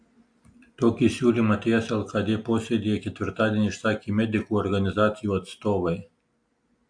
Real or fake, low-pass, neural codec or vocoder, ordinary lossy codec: real; 9.9 kHz; none; AAC, 64 kbps